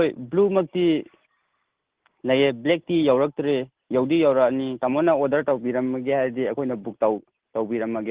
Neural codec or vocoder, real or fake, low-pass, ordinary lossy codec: none; real; 3.6 kHz; Opus, 16 kbps